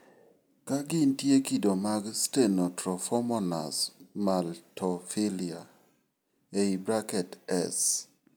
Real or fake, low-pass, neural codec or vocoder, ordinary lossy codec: real; none; none; none